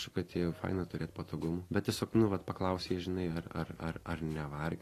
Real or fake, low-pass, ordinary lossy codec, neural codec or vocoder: real; 14.4 kHz; AAC, 48 kbps; none